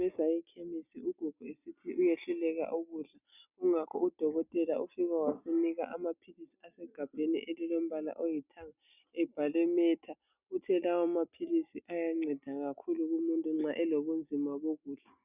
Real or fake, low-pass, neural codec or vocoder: real; 3.6 kHz; none